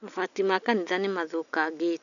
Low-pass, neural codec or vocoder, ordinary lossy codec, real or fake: 7.2 kHz; none; none; real